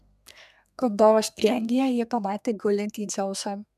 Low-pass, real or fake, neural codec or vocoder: 14.4 kHz; fake; codec, 32 kHz, 1.9 kbps, SNAC